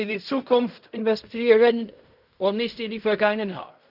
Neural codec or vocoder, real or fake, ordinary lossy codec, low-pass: codec, 16 kHz in and 24 kHz out, 0.4 kbps, LongCat-Audio-Codec, fine tuned four codebook decoder; fake; none; 5.4 kHz